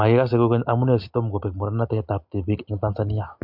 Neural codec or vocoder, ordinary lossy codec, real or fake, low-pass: none; MP3, 48 kbps; real; 5.4 kHz